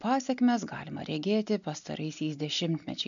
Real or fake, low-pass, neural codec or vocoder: real; 7.2 kHz; none